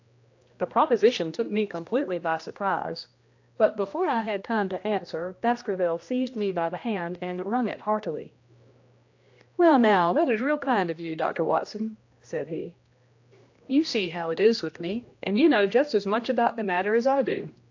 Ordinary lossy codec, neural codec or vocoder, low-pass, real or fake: AAC, 48 kbps; codec, 16 kHz, 1 kbps, X-Codec, HuBERT features, trained on general audio; 7.2 kHz; fake